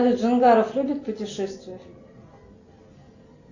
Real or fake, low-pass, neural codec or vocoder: real; 7.2 kHz; none